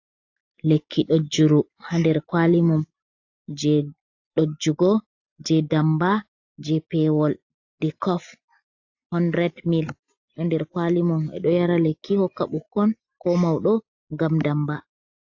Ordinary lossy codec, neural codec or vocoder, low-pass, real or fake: AAC, 48 kbps; none; 7.2 kHz; real